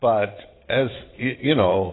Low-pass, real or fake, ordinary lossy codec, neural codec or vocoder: 7.2 kHz; fake; AAC, 16 kbps; vocoder, 22.05 kHz, 80 mel bands, Vocos